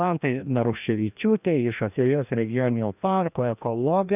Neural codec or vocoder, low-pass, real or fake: codec, 16 kHz, 1 kbps, FreqCodec, larger model; 3.6 kHz; fake